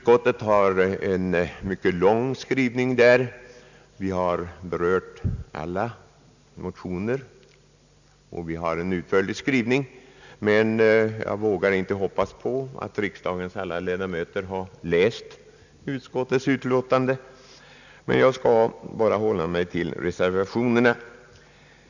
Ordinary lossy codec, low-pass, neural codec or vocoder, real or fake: none; 7.2 kHz; none; real